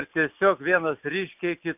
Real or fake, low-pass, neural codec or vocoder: real; 3.6 kHz; none